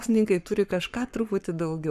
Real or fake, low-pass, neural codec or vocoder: fake; 14.4 kHz; codec, 44.1 kHz, 7.8 kbps, Pupu-Codec